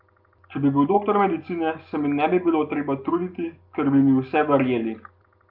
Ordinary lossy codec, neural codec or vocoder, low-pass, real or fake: Opus, 24 kbps; none; 5.4 kHz; real